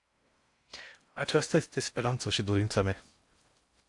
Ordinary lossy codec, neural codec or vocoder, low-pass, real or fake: AAC, 64 kbps; codec, 16 kHz in and 24 kHz out, 0.6 kbps, FocalCodec, streaming, 4096 codes; 10.8 kHz; fake